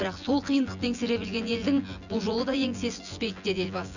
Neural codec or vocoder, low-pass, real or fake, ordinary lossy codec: vocoder, 24 kHz, 100 mel bands, Vocos; 7.2 kHz; fake; none